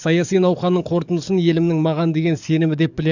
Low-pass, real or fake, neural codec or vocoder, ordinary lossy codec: 7.2 kHz; fake; codec, 44.1 kHz, 7.8 kbps, DAC; none